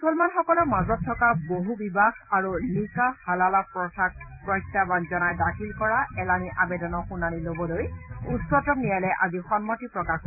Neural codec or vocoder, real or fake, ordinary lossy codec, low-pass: vocoder, 44.1 kHz, 128 mel bands every 256 samples, BigVGAN v2; fake; none; 3.6 kHz